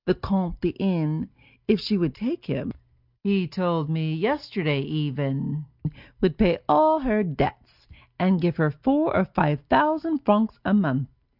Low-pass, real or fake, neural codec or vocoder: 5.4 kHz; real; none